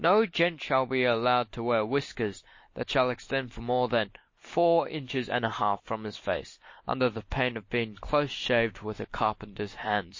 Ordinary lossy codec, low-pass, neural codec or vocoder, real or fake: MP3, 32 kbps; 7.2 kHz; none; real